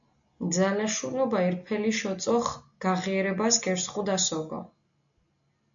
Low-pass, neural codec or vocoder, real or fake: 7.2 kHz; none; real